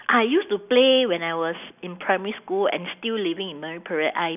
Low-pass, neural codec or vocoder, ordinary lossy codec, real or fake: 3.6 kHz; none; none; real